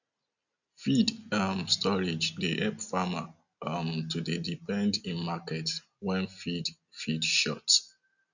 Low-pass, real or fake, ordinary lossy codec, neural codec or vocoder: 7.2 kHz; real; none; none